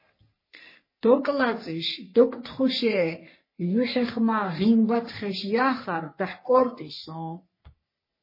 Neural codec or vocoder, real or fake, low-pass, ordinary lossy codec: codec, 44.1 kHz, 3.4 kbps, Pupu-Codec; fake; 5.4 kHz; MP3, 24 kbps